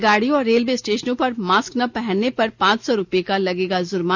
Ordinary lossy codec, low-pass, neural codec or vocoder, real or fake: none; none; none; real